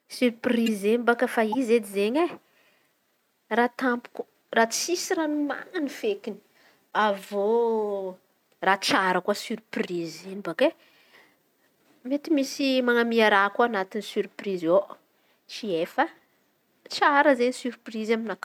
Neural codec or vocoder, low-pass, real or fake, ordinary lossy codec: none; 19.8 kHz; real; none